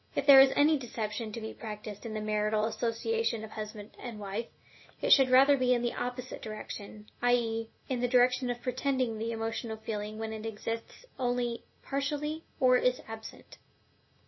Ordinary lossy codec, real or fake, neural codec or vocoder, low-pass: MP3, 24 kbps; real; none; 7.2 kHz